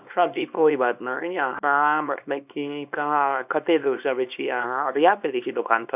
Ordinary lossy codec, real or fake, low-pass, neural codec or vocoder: none; fake; 3.6 kHz; codec, 24 kHz, 0.9 kbps, WavTokenizer, small release